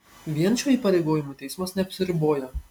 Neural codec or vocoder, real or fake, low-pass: none; real; 19.8 kHz